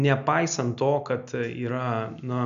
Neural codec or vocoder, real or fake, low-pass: none; real; 7.2 kHz